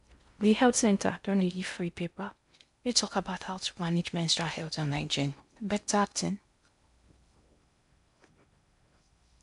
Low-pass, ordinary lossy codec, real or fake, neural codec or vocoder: 10.8 kHz; MP3, 96 kbps; fake; codec, 16 kHz in and 24 kHz out, 0.6 kbps, FocalCodec, streaming, 4096 codes